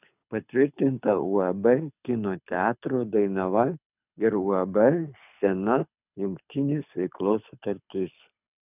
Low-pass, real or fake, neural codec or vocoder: 3.6 kHz; fake; codec, 24 kHz, 6 kbps, HILCodec